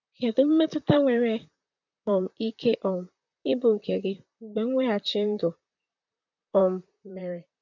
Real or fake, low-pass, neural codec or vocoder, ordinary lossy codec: fake; 7.2 kHz; vocoder, 44.1 kHz, 128 mel bands, Pupu-Vocoder; none